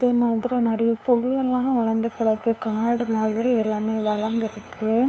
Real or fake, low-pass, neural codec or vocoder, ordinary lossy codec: fake; none; codec, 16 kHz, 2 kbps, FunCodec, trained on LibriTTS, 25 frames a second; none